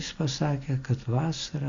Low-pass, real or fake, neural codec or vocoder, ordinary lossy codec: 7.2 kHz; real; none; Opus, 64 kbps